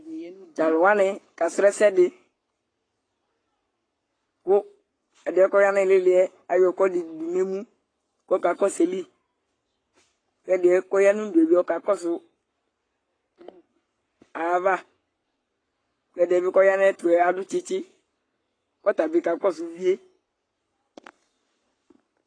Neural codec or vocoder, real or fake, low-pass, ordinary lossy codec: codec, 44.1 kHz, 7.8 kbps, Pupu-Codec; fake; 9.9 kHz; AAC, 32 kbps